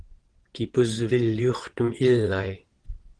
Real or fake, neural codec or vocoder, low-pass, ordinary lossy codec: fake; vocoder, 22.05 kHz, 80 mel bands, WaveNeXt; 9.9 kHz; Opus, 16 kbps